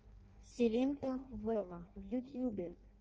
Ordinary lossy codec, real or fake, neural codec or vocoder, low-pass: Opus, 24 kbps; fake; codec, 16 kHz in and 24 kHz out, 0.6 kbps, FireRedTTS-2 codec; 7.2 kHz